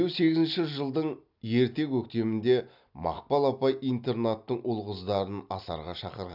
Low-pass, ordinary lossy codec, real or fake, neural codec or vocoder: 5.4 kHz; none; real; none